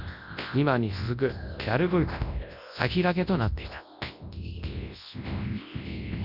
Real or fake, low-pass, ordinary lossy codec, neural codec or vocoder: fake; 5.4 kHz; Opus, 64 kbps; codec, 24 kHz, 0.9 kbps, WavTokenizer, large speech release